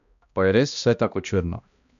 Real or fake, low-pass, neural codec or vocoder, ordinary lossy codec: fake; 7.2 kHz; codec, 16 kHz, 1 kbps, X-Codec, HuBERT features, trained on balanced general audio; none